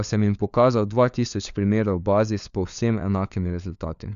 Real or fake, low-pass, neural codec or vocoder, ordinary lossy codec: fake; 7.2 kHz; codec, 16 kHz, 4 kbps, FunCodec, trained on LibriTTS, 50 frames a second; none